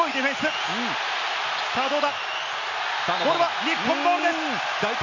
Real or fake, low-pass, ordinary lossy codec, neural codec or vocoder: real; 7.2 kHz; none; none